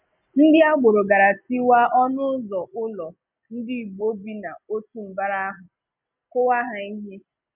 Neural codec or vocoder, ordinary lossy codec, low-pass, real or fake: none; none; 3.6 kHz; real